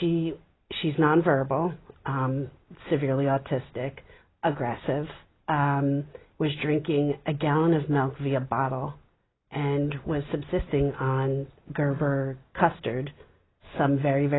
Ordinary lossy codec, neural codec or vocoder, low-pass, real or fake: AAC, 16 kbps; none; 7.2 kHz; real